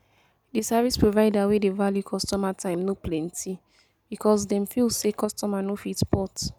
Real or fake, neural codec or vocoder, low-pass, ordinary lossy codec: real; none; none; none